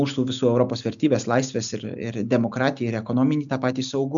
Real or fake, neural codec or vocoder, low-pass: real; none; 7.2 kHz